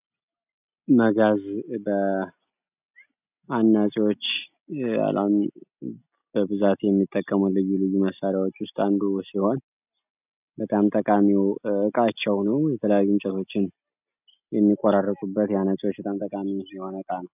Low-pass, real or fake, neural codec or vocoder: 3.6 kHz; real; none